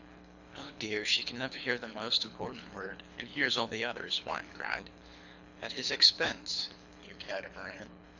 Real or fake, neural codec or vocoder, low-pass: fake; codec, 24 kHz, 3 kbps, HILCodec; 7.2 kHz